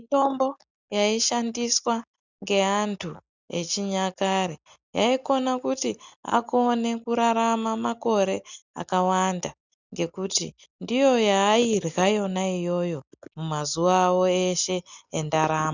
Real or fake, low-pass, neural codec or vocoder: real; 7.2 kHz; none